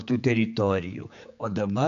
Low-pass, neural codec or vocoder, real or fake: 7.2 kHz; codec, 16 kHz, 4 kbps, X-Codec, HuBERT features, trained on general audio; fake